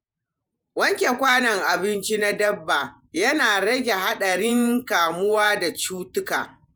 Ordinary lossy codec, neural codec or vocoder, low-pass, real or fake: none; vocoder, 48 kHz, 128 mel bands, Vocos; none; fake